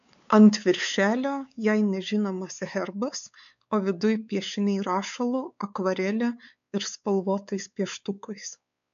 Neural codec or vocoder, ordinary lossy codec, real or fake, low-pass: codec, 16 kHz, 4 kbps, X-Codec, WavLM features, trained on Multilingual LibriSpeech; AAC, 96 kbps; fake; 7.2 kHz